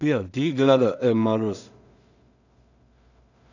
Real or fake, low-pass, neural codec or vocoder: fake; 7.2 kHz; codec, 16 kHz in and 24 kHz out, 0.4 kbps, LongCat-Audio-Codec, two codebook decoder